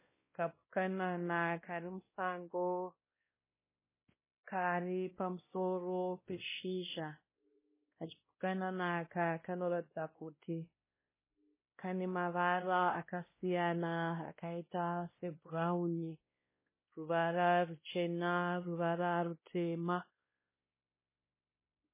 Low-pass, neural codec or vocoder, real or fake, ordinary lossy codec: 3.6 kHz; codec, 16 kHz, 1 kbps, X-Codec, WavLM features, trained on Multilingual LibriSpeech; fake; MP3, 16 kbps